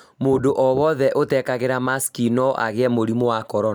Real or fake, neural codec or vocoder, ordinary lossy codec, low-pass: real; none; none; none